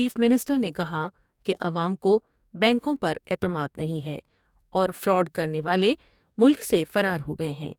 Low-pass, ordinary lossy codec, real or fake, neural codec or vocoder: 19.8 kHz; none; fake; codec, 44.1 kHz, 2.6 kbps, DAC